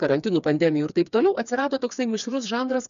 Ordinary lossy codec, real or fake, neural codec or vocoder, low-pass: MP3, 96 kbps; fake; codec, 16 kHz, 4 kbps, FreqCodec, smaller model; 7.2 kHz